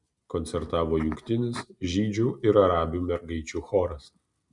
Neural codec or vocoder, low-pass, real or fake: none; 10.8 kHz; real